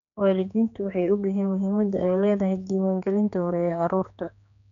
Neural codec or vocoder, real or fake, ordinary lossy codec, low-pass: codec, 16 kHz, 4 kbps, X-Codec, HuBERT features, trained on general audio; fake; none; 7.2 kHz